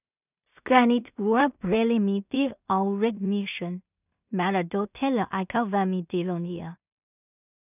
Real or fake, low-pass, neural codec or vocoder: fake; 3.6 kHz; codec, 16 kHz in and 24 kHz out, 0.4 kbps, LongCat-Audio-Codec, two codebook decoder